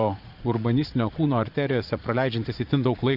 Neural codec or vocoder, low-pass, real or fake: vocoder, 44.1 kHz, 80 mel bands, Vocos; 5.4 kHz; fake